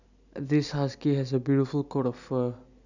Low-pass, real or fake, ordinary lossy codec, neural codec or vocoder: 7.2 kHz; real; none; none